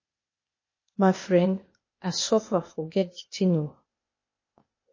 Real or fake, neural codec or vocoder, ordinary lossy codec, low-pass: fake; codec, 16 kHz, 0.8 kbps, ZipCodec; MP3, 32 kbps; 7.2 kHz